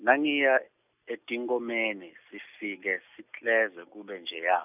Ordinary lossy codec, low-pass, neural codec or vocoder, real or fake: none; 3.6 kHz; none; real